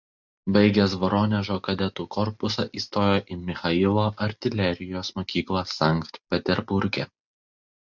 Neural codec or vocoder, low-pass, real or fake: none; 7.2 kHz; real